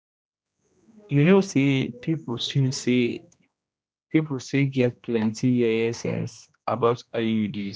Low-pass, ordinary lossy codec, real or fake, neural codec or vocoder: none; none; fake; codec, 16 kHz, 1 kbps, X-Codec, HuBERT features, trained on general audio